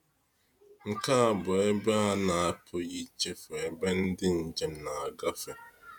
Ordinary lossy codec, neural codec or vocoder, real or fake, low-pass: none; vocoder, 48 kHz, 128 mel bands, Vocos; fake; none